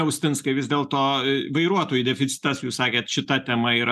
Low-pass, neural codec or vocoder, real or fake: 14.4 kHz; none; real